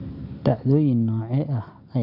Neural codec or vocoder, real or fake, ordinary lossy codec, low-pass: none; real; none; 5.4 kHz